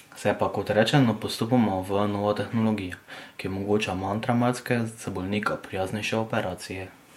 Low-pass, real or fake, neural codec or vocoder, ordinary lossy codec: 19.8 kHz; fake; vocoder, 48 kHz, 128 mel bands, Vocos; MP3, 64 kbps